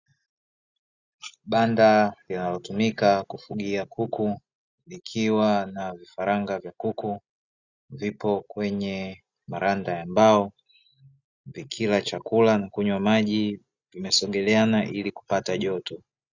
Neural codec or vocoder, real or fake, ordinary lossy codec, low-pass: none; real; Opus, 64 kbps; 7.2 kHz